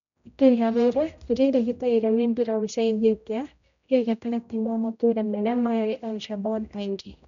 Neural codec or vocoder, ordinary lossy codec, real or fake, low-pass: codec, 16 kHz, 0.5 kbps, X-Codec, HuBERT features, trained on general audio; none; fake; 7.2 kHz